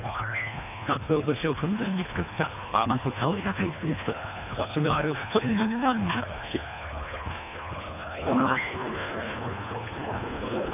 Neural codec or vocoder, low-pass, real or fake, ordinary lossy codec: codec, 24 kHz, 1.5 kbps, HILCodec; 3.6 kHz; fake; none